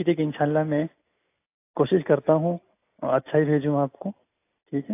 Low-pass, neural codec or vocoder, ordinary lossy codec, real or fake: 3.6 kHz; none; AAC, 24 kbps; real